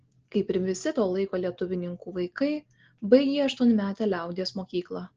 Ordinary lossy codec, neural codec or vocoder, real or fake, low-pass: Opus, 16 kbps; none; real; 7.2 kHz